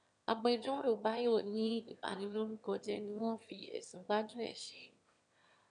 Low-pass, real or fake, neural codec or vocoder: 9.9 kHz; fake; autoencoder, 22.05 kHz, a latent of 192 numbers a frame, VITS, trained on one speaker